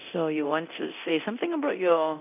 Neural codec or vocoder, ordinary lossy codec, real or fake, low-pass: codec, 24 kHz, 0.9 kbps, DualCodec; none; fake; 3.6 kHz